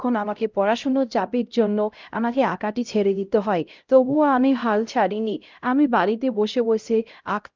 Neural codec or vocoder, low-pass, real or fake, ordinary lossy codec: codec, 16 kHz, 0.5 kbps, X-Codec, HuBERT features, trained on LibriSpeech; 7.2 kHz; fake; Opus, 24 kbps